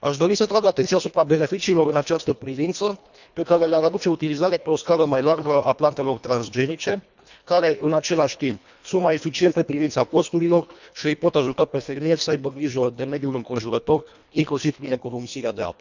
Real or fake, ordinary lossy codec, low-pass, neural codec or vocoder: fake; none; 7.2 kHz; codec, 24 kHz, 1.5 kbps, HILCodec